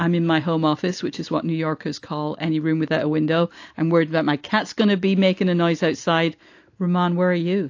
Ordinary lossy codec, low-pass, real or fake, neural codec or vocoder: AAC, 48 kbps; 7.2 kHz; real; none